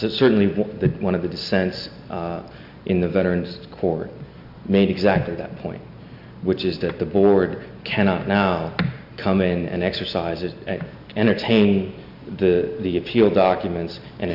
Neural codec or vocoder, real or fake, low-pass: none; real; 5.4 kHz